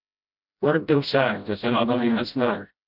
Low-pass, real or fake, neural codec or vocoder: 5.4 kHz; fake; codec, 16 kHz, 0.5 kbps, FreqCodec, smaller model